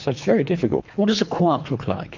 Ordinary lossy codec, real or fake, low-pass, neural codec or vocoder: MP3, 48 kbps; fake; 7.2 kHz; codec, 24 kHz, 3 kbps, HILCodec